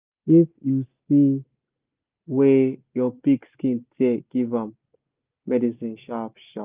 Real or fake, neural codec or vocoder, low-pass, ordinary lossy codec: real; none; 3.6 kHz; Opus, 24 kbps